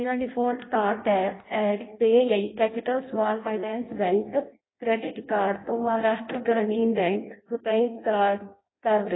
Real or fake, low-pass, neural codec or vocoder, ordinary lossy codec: fake; 7.2 kHz; codec, 16 kHz in and 24 kHz out, 0.6 kbps, FireRedTTS-2 codec; AAC, 16 kbps